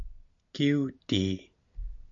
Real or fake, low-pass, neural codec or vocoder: real; 7.2 kHz; none